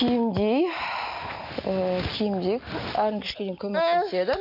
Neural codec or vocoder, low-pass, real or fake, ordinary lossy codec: none; 5.4 kHz; real; none